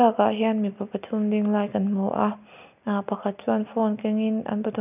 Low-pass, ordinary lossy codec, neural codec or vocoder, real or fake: 3.6 kHz; none; none; real